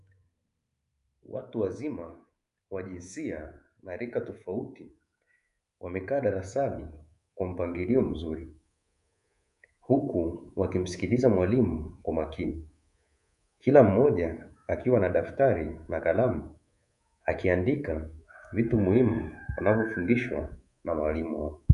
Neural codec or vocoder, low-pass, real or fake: codec, 24 kHz, 3.1 kbps, DualCodec; 10.8 kHz; fake